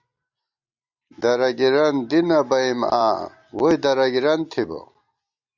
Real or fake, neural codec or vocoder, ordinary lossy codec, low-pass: real; none; Opus, 64 kbps; 7.2 kHz